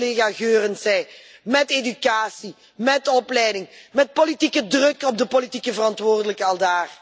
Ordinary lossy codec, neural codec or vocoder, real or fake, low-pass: none; none; real; none